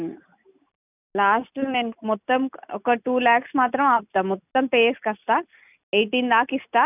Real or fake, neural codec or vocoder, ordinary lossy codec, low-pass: real; none; none; 3.6 kHz